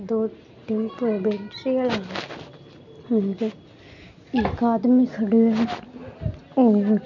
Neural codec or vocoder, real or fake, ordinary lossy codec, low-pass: none; real; none; 7.2 kHz